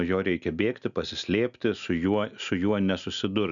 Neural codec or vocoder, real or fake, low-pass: none; real; 7.2 kHz